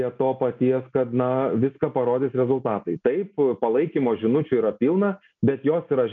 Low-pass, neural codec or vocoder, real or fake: 7.2 kHz; none; real